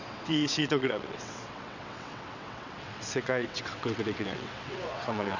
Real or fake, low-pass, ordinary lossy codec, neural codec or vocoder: fake; 7.2 kHz; none; vocoder, 22.05 kHz, 80 mel bands, WaveNeXt